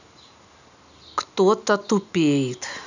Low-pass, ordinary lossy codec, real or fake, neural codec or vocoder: 7.2 kHz; none; real; none